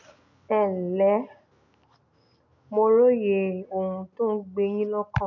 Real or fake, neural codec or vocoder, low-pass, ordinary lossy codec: real; none; 7.2 kHz; none